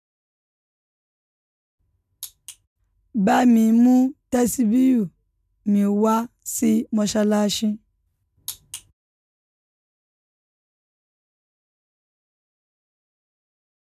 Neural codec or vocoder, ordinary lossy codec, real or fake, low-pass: none; none; real; 14.4 kHz